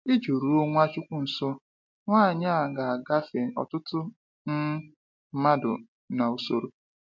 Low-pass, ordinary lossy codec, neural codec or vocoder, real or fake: 7.2 kHz; MP3, 48 kbps; none; real